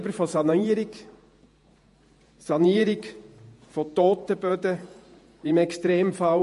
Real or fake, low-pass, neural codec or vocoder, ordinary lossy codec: fake; 14.4 kHz; vocoder, 48 kHz, 128 mel bands, Vocos; MP3, 48 kbps